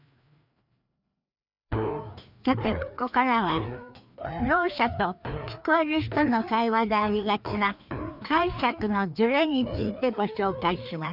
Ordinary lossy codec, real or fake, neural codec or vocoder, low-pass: none; fake; codec, 16 kHz, 2 kbps, FreqCodec, larger model; 5.4 kHz